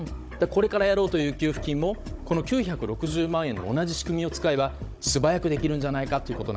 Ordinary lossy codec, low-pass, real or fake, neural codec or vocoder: none; none; fake; codec, 16 kHz, 16 kbps, FunCodec, trained on Chinese and English, 50 frames a second